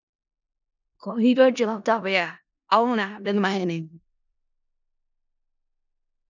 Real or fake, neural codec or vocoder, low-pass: fake; codec, 16 kHz in and 24 kHz out, 0.4 kbps, LongCat-Audio-Codec, four codebook decoder; 7.2 kHz